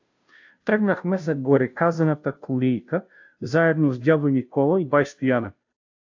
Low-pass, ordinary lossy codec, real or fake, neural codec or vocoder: 7.2 kHz; AAC, 48 kbps; fake; codec, 16 kHz, 0.5 kbps, FunCodec, trained on Chinese and English, 25 frames a second